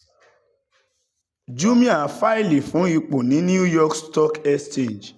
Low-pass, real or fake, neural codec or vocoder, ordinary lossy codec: none; real; none; none